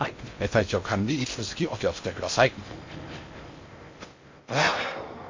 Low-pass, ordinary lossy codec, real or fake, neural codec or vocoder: 7.2 kHz; MP3, 48 kbps; fake; codec, 16 kHz in and 24 kHz out, 0.6 kbps, FocalCodec, streaming, 2048 codes